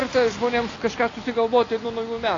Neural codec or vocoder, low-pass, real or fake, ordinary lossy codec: none; 7.2 kHz; real; AAC, 32 kbps